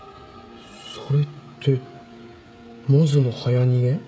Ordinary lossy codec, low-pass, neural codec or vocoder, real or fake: none; none; codec, 16 kHz, 16 kbps, FreqCodec, smaller model; fake